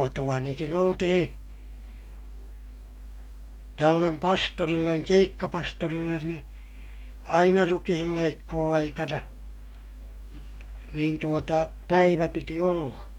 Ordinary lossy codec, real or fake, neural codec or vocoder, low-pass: none; fake; codec, 44.1 kHz, 2.6 kbps, DAC; 19.8 kHz